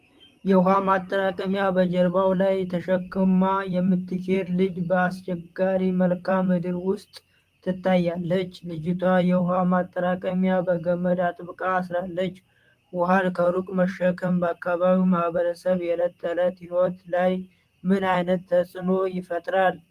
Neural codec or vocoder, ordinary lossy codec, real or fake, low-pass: vocoder, 44.1 kHz, 128 mel bands, Pupu-Vocoder; Opus, 32 kbps; fake; 14.4 kHz